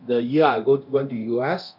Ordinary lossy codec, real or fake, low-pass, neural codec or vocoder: none; fake; 5.4 kHz; codec, 24 kHz, 0.9 kbps, DualCodec